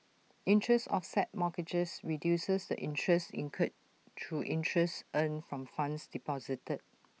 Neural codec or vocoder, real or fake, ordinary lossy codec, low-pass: none; real; none; none